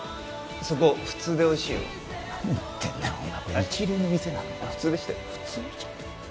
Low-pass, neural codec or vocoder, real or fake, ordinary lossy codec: none; none; real; none